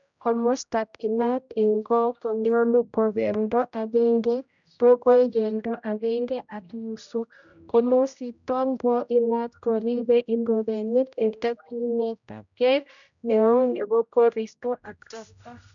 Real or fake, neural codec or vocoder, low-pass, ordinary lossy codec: fake; codec, 16 kHz, 0.5 kbps, X-Codec, HuBERT features, trained on general audio; 7.2 kHz; none